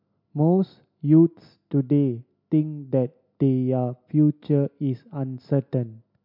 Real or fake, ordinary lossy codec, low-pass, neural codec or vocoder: real; MP3, 48 kbps; 5.4 kHz; none